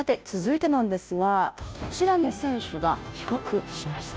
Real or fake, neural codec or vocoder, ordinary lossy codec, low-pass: fake; codec, 16 kHz, 0.5 kbps, FunCodec, trained on Chinese and English, 25 frames a second; none; none